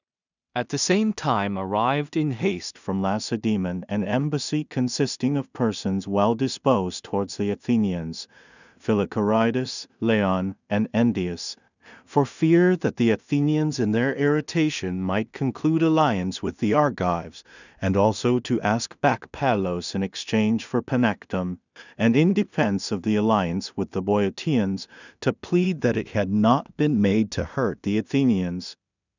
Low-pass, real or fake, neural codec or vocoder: 7.2 kHz; fake; codec, 16 kHz in and 24 kHz out, 0.4 kbps, LongCat-Audio-Codec, two codebook decoder